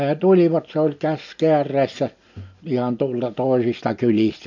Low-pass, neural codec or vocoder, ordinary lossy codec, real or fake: 7.2 kHz; none; none; real